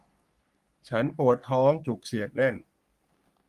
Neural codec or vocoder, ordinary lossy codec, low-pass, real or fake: codec, 44.1 kHz, 3.4 kbps, Pupu-Codec; Opus, 32 kbps; 14.4 kHz; fake